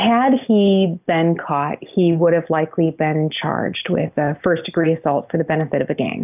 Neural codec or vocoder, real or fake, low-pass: none; real; 3.6 kHz